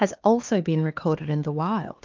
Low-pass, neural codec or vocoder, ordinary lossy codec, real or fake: 7.2 kHz; codec, 16 kHz, 2 kbps, X-Codec, HuBERT features, trained on LibriSpeech; Opus, 32 kbps; fake